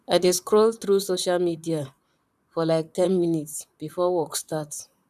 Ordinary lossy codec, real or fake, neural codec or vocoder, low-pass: none; fake; vocoder, 44.1 kHz, 128 mel bands, Pupu-Vocoder; 14.4 kHz